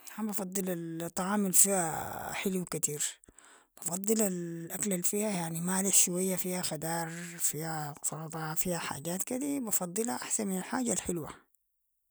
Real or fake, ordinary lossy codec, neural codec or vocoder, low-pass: real; none; none; none